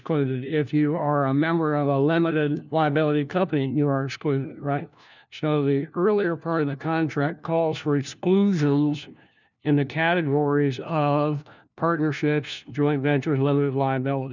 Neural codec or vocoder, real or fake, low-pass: codec, 16 kHz, 1 kbps, FunCodec, trained on LibriTTS, 50 frames a second; fake; 7.2 kHz